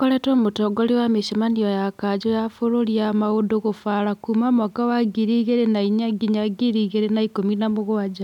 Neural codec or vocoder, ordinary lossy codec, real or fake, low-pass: none; none; real; 19.8 kHz